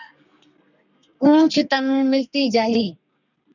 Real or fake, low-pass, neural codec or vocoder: fake; 7.2 kHz; codec, 32 kHz, 1.9 kbps, SNAC